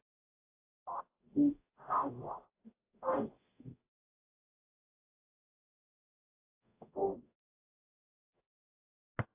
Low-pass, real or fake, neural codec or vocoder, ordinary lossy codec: 3.6 kHz; fake; codec, 44.1 kHz, 0.9 kbps, DAC; AAC, 16 kbps